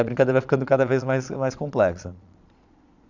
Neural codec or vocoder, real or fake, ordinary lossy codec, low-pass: vocoder, 22.05 kHz, 80 mel bands, Vocos; fake; none; 7.2 kHz